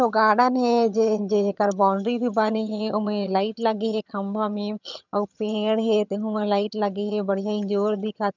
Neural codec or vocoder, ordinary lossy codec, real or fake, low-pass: vocoder, 22.05 kHz, 80 mel bands, HiFi-GAN; none; fake; 7.2 kHz